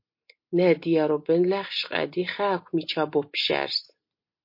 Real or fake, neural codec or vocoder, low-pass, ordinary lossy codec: real; none; 5.4 kHz; MP3, 32 kbps